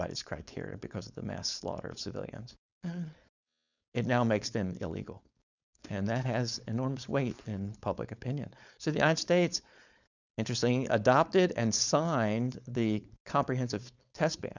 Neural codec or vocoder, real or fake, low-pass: codec, 16 kHz, 4.8 kbps, FACodec; fake; 7.2 kHz